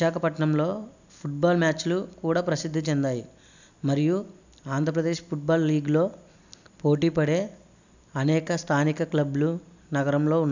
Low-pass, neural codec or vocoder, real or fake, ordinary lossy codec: 7.2 kHz; none; real; none